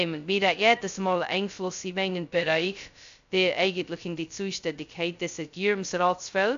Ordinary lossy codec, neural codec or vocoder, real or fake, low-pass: AAC, 64 kbps; codec, 16 kHz, 0.2 kbps, FocalCodec; fake; 7.2 kHz